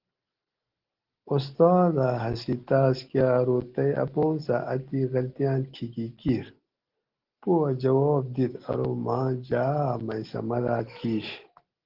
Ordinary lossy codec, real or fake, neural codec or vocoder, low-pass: Opus, 16 kbps; real; none; 5.4 kHz